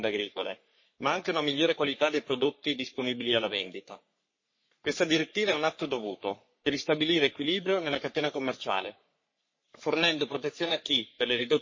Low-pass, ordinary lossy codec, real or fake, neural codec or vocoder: 7.2 kHz; MP3, 32 kbps; fake; codec, 44.1 kHz, 3.4 kbps, Pupu-Codec